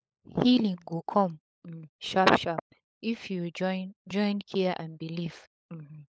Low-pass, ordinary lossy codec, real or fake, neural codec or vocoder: none; none; fake; codec, 16 kHz, 16 kbps, FunCodec, trained on LibriTTS, 50 frames a second